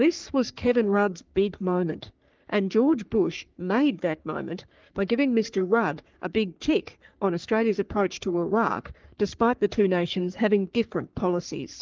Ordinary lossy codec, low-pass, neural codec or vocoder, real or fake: Opus, 24 kbps; 7.2 kHz; codec, 44.1 kHz, 3.4 kbps, Pupu-Codec; fake